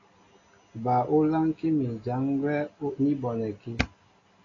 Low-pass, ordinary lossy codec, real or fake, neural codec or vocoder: 7.2 kHz; AAC, 32 kbps; real; none